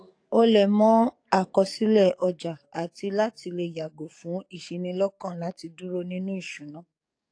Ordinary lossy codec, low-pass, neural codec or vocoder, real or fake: AAC, 48 kbps; 9.9 kHz; codec, 44.1 kHz, 7.8 kbps, DAC; fake